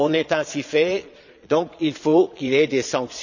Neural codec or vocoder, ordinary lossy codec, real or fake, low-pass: vocoder, 22.05 kHz, 80 mel bands, Vocos; none; fake; 7.2 kHz